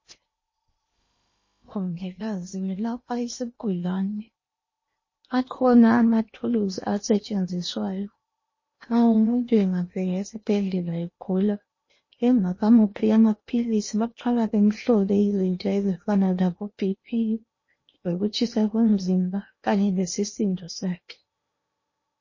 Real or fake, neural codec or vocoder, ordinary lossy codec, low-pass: fake; codec, 16 kHz in and 24 kHz out, 0.8 kbps, FocalCodec, streaming, 65536 codes; MP3, 32 kbps; 7.2 kHz